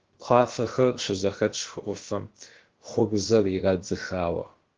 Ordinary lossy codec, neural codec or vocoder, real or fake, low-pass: Opus, 16 kbps; codec, 16 kHz, about 1 kbps, DyCAST, with the encoder's durations; fake; 7.2 kHz